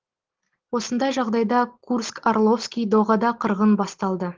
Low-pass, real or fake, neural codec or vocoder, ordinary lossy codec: 7.2 kHz; real; none; Opus, 16 kbps